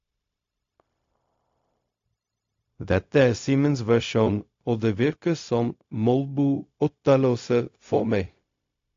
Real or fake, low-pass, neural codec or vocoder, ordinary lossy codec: fake; 7.2 kHz; codec, 16 kHz, 0.4 kbps, LongCat-Audio-Codec; AAC, 48 kbps